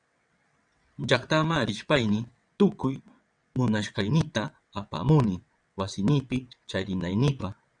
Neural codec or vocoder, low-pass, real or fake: vocoder, 22.05 kHz, 80 mel bands, WaveNeXt; 9.9 kHz; fake